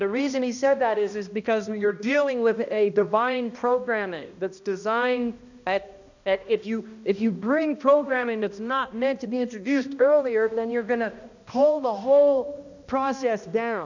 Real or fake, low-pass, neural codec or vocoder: fake; 7.2 kHz; codec, 16 kHz, 1 kbps, X-Codec, HuBERT features, trained on balanced general audio